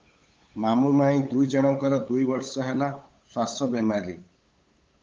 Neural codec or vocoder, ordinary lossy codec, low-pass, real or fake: codec, 16 kHz, 8 kbps, FunCodec, trained on LibriTTS, 25 frames a second; Opus, 32 kbps; 7.2 kHz; fake